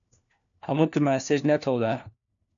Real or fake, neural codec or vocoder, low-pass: fake; codec, 16 kHz, 1 kbps, FunCodec, trained on LibriTTS, 50 frames a second; 7.2 kHz